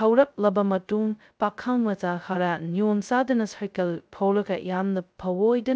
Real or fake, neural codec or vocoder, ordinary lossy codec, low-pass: fake; codec, 16 kHz, 0.2 kbps, FocalCodec; none; none